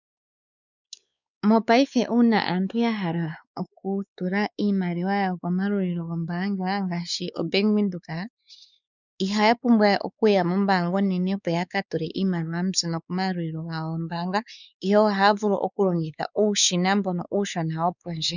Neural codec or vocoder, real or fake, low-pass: codec, 16 kHz, 4 kbps, X-Codec, WavLM features, trained on Multilingual LibriSpeech; fake; 7.2 kHz